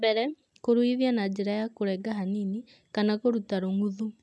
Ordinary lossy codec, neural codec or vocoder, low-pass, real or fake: none; none; none; real